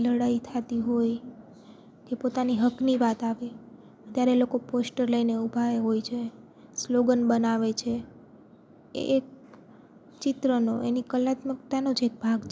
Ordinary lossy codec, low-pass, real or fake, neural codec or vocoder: none; none; real; none